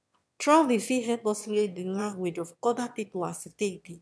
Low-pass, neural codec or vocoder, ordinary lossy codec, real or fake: none; autoencoder, 22.05 kHz, a latent of 192 numbers a frame, VITS, trained on one speaker; none; fake